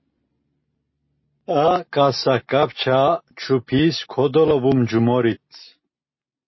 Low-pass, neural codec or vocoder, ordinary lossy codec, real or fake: 7.2 kHz; none; MP3, 24 kbps; real